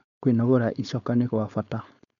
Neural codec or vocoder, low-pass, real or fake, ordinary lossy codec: codec, 16 kHz, 4.8 kbps, FACodec; 7.2 kHz; fake; none